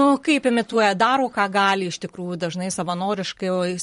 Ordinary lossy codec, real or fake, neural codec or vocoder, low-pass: MP3, 48 kbps; fake; vocoder, 44.1 kHz, 128 mel bands every 512 samples, BigVGAN v2; 19.8 kHz